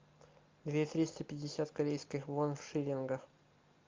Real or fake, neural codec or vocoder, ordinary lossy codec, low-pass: real; none; Opus, 24 kbps; 7.2 kHz